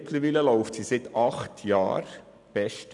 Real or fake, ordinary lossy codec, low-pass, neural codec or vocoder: real; none; 10.8 kHz; none